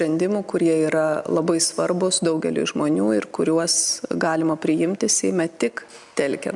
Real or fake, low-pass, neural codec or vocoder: real; 10.8 kHz; none